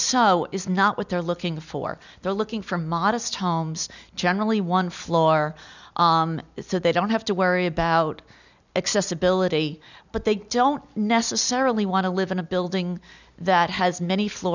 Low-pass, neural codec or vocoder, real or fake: 7.2 kHz; none; real